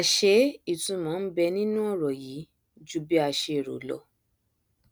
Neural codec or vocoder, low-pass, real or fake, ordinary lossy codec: none; none; real; none